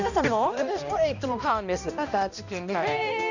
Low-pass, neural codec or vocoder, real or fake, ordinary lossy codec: 7.2 kHz; codec, 16 kHz, 1 kbps, X-Codec, HuBERT features, trained on balanced general audio; fake; none